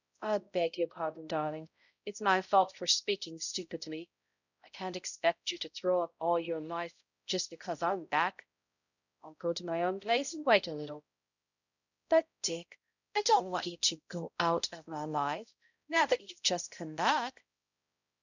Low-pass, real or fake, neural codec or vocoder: 7.2 kHz; fake; codec, 16 kHz, 0.5 kbps, X-Codec, HuBERT features, trained on balanced general audio